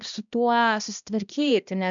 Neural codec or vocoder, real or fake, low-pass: codec, 16 kHz, 1 kbps, X-Codec, HuBERT features, trained on balanced general audio; fake; 7.2 kHz